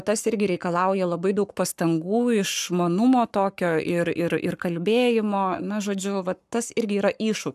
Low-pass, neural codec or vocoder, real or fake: 14.4 kHz; codec, 44.1 kHz, 7.8 kbps, Pupu-Codec; fake